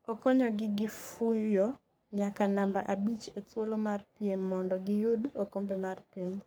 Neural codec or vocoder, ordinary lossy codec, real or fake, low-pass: codec, 44.1 kHz, 3.4 kbps, Pupu-Codec; none; fake; none